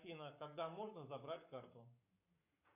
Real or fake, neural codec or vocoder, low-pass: fake; codec, 44.1 kHz, 7.8 kbps, Pupu-Codec; 3.6 kHz